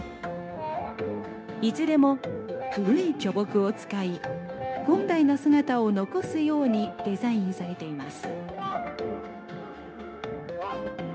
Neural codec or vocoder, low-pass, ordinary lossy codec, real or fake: codec, 16 kHz, 0.9 kbps, LongCat-Audio-Codec; none; none; fake